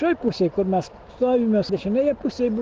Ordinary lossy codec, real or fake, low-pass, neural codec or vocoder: Opus, 16 kbps; real; 7.2 kHz; none